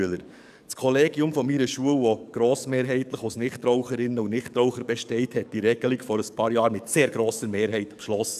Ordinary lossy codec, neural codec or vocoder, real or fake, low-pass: none; codec, 44.1 kHz, 7.8 kbps, DAC; fake; 14.4 kHz